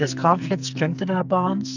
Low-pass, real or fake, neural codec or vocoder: 7.2 kHz; fake; codec, 44.1 kHz, 2.6 kbps, SNAC